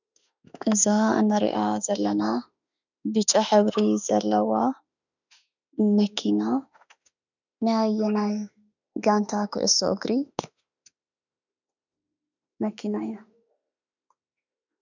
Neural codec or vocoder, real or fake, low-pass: autoencoder, 48 kHz, 32 numbers a frame, DAC-VAE, trained on Japanese speech; fake; 7.2 kHz